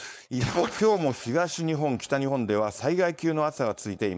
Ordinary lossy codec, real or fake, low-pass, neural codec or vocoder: none; fake; none; codec, 16 kHz, 4.8 kbps, FACodec